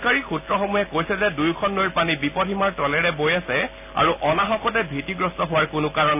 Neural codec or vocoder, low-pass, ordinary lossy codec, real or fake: none; 3.6 kHz; none; real